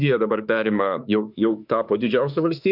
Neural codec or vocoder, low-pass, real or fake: autoencoder, 48 kHz, 32 numbers a frame, DAC-VAE, trained on Japanese speech; 5.4 kHz; fake